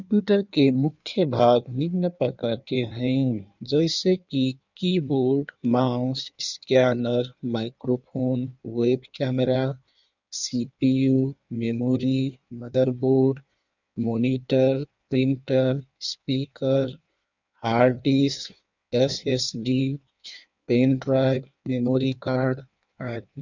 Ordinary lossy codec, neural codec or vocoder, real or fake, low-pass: none; codec, 16 kHz in and 24 kHz out, 1.1 kbps, FireRedTTS-2 codec; fake; 7.2 kHz